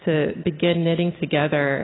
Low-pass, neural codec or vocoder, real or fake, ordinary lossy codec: 7.2 kHz; none; real; AAC, 16 kbps